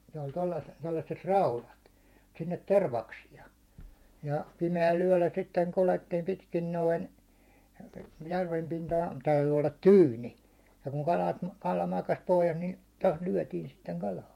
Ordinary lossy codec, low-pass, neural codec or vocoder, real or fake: MP3, 64 kbps; 19.8 kHz; vocoder, 48 kHz, 128 mel bands, Vocos; fake